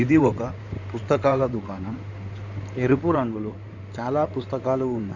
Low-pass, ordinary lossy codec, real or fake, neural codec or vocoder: 7.2 kHz; none; fake; codec, 16 kHz in and 24 kHz out, 2.2 kbps, FireRedTTS-2 codec